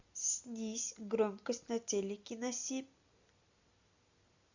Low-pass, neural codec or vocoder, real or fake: 7.2 kHz; none; real